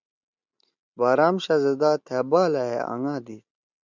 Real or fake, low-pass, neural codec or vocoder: real; 7.2 kHz; none